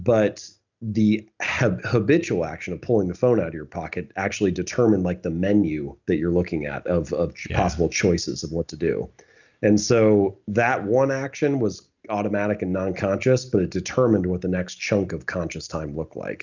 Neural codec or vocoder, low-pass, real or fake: none; 7.2 kHz; real